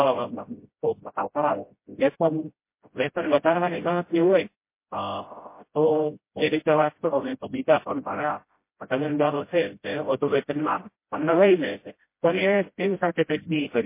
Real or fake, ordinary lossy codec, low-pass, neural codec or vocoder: fake; MP3, 24 kbps; 3.6 kHz; codec, 16 kHz, 0.5 kbps, FreqCodec, smaller model